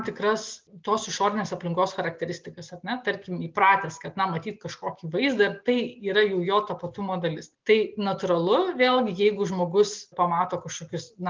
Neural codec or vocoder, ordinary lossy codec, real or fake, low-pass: none; Opus, 32 kbps; real; 7.2 kHz